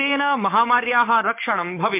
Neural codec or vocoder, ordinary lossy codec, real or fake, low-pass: codec, 16 kHz, 6 kbps, DAC; MP3, 32 kbps; fake; 3.6 kHz